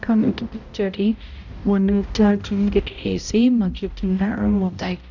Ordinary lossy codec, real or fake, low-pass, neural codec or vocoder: none; fake; 7.2 kHz; codec, 16 kHz, 0.5 kbps, X-Codec, HuBERT features, trained on balanced general audio